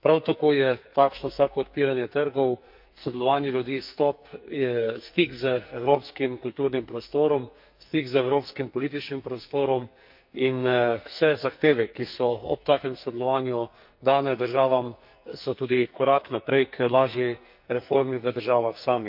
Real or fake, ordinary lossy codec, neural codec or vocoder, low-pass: fake; MP3, 48 kbps; codec, 44.1 kHz, 2.6 kbps, SNAC; 5.4 kHz